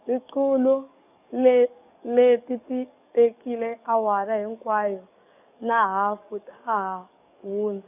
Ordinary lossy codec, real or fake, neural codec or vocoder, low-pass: none; fake; codec, 44.1 kHz, 7.8 kbps, DAC; 3.6 kHz